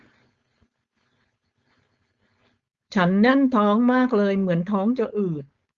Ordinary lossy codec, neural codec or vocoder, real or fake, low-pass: Opus, 32 kbps; codec, 16 kHz, 4.8 kbps, FACodec; fake; 7.2 kHz